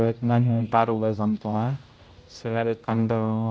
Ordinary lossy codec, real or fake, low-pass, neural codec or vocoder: none; fake; none; codec, 16 kHz, 0.5 kbps, X-Codec, HuBERT features, trained on general audio